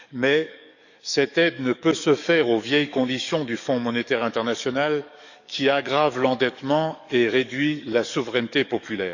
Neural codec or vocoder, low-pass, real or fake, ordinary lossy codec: codec, 44.1 kHz, 7.8 kbps, DAC; 7.2 kHz; fake; none